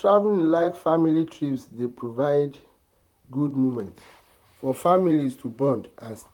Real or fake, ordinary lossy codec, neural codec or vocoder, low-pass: fake; none; vocoder, 44.1 kHz, 128 mel bands, Pupu-Vocoder; 19.8 kHz